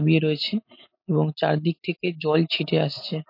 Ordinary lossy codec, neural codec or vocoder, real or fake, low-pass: MP3, 32 kbps; none; real; 5.4 kHz